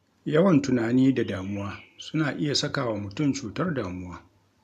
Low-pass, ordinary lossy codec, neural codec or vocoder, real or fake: 14.4 kHz; none; none; real